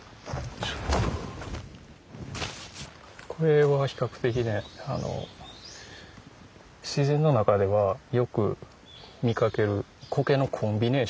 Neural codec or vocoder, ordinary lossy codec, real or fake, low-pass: none; none; real; none